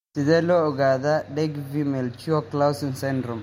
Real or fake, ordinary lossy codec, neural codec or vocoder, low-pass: real; MP3, 64 kbps; none; 19.8 kHz